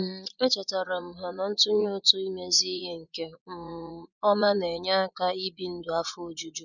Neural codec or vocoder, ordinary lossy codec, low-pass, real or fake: vocoder, 24 kHz, 100 mel bands, Vocos; none; 7.2 kHz; fake